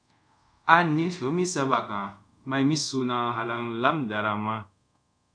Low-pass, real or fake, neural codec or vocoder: 9.9 kHz; fake; codec, 24 kHz, 0.5 kbps, DualCodec